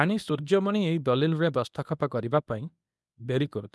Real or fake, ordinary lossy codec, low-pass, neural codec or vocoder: fake; none; none; codec, 24 kHz, 0.9 kbps, WavTokenizer, medium speech release version 1